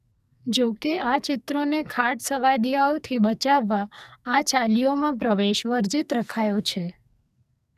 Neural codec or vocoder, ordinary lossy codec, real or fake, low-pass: codec, 44.1 kHz, 2.6 kbps, SNAC; none; fake; 14.4 kHz